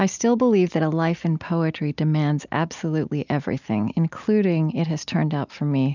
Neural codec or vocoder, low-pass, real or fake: none; 7.2 kHz; real